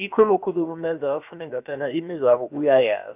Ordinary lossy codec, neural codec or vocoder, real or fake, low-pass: none; codec, 16 kHz, about 1 kbps, DyCAST, with the encoder's durations; fake; 3.6 kHz